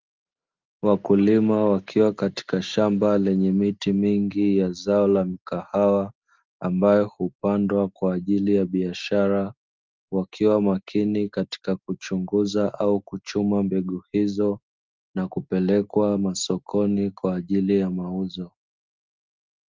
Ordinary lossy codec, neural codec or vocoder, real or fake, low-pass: Opus, 32 kbps; none; real; 7.2 kHz